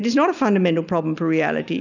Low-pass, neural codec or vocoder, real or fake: 7.2 kHz; none; real